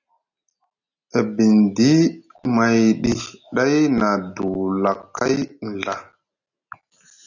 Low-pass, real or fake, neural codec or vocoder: 7.2 kHz; real; none